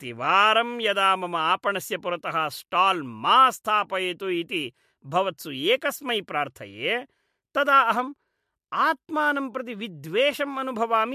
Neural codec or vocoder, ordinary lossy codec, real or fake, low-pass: none; MP3, 64 kbps; real; 14.4 kHz